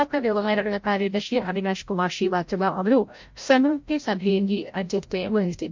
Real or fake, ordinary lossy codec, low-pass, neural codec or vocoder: fake; MP3, 48 kbps; 7.2 kHz; codec, 16 kHz, 0.5 kbps, FreqCodec, larger model